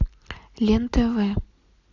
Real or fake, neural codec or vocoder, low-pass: real; none; 7.2 kHz